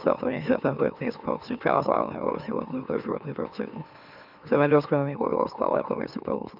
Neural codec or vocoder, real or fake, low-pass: autoencoder, 44.1 kHz, a latent of 192 numbers a frame, MeloTTS; fake; 5.4 kHz